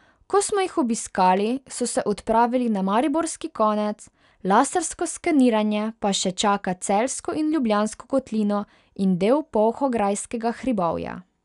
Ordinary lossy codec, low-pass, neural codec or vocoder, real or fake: none; 10.8 kHz; none; real